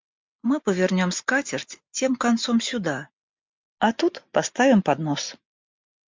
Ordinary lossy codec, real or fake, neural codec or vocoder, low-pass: MP3, 64 kbps; real; none; 7.2 kHz